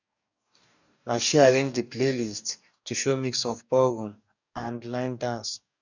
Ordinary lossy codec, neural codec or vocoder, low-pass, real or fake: none; codec, 44.1 kHz, 2.6 kbps, DAC; 7.2 kHz; fake